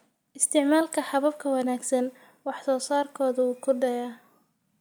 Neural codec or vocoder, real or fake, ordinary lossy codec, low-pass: none; real; none; none